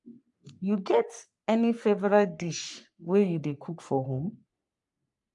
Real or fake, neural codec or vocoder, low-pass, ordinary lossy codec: fake; codec, 44.1 kHz, 3.4 kbps, Pupu-Codec; 10.8 kHz; AAC, 64 kbps